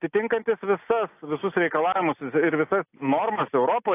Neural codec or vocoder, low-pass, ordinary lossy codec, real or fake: none; 3.6 kHz; AAC, 24 kbps; real